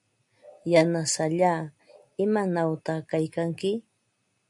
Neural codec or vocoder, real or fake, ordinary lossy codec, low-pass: none; real; AAC, 64 kbps; 10.8 kHz